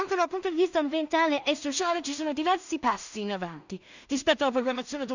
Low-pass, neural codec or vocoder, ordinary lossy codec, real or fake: 7.2 kHz; codec, 16 kHz in and 24 kHz out, 0.4 kbps, LongCat-Audio-Codec, two codebook decoder; none; fake